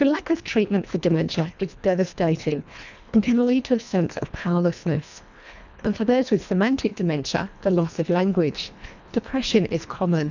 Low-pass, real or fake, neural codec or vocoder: 7.2 kHz; fake; codec, 24 kHz, 1.5 kbps, HILCodec